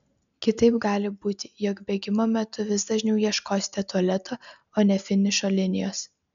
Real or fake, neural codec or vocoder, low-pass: real; none; 7.2 kHz